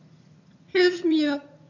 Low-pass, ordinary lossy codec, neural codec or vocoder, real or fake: 7.2 kHz; none; vocoder, 22.05 kHz, 80 mel bands, HiFi-GAN; fake